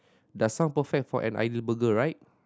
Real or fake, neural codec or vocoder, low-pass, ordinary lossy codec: real; none; none; none